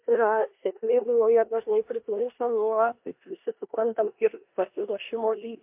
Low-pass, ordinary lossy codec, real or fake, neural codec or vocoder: 3.6 kHz; MP3, 32 kbps; fake; codec, 16 kHz in and 24 kHz out, 0.9 kbps, LongCat-Audio-Codec, four codebook decoder